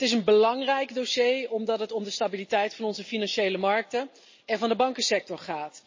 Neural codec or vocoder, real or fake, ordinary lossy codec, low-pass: none; real; MP3, 32 kbps; 7.2 kHz